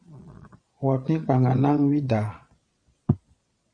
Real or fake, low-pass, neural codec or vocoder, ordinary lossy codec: fake; 9.9 kHz; vocoder, 22.05 kHz, 80 mel bands, Vocos; MP3, 96 kbps